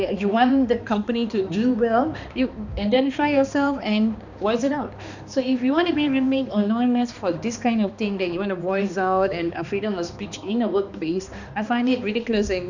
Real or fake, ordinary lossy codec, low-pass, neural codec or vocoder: fake; none; 7.2 kHz; codec, 16 kHz, 2 kbps, X-Codec, HuBERT features, trained on balanced general audio